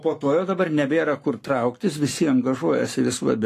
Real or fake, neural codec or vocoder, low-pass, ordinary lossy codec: fake; codec, 44.1 kHz, 7.8 kbps, Pupu-Codec; 14.4 kHz; AAC, 48 kbps